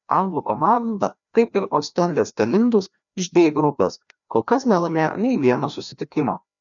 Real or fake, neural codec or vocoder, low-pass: fake; codec, 16 kHz, 1 kbps, FreqCodec, larger model; 7.2 kHz